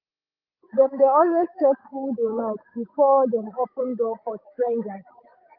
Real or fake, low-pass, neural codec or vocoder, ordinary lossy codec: fake; 5.4 kHz; codec, 16 kHz, 8 kbps, FreqCodec, larger model; Opus, 32 kbps